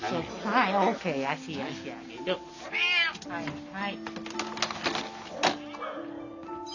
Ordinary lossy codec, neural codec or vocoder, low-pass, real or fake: none; none; 7.2 kHz; real